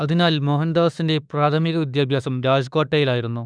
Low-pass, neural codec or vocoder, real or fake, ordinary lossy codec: 9.9 kHz; autoencoder, 48 kHz, 32 numbers a frame, DAC-VAE, trained on Japanese speech; fake; none